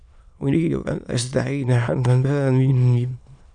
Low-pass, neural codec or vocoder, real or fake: 9.9 kHz; autoencoder, 22.05 kHz, a latent of 192 numbers a frame, VITS, trained on many speakers; fake